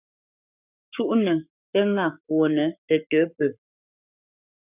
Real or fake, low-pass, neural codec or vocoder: fake; 3.6 kHz; codec, 44.1 kHz, 7.8 kbps, Pupu-Codec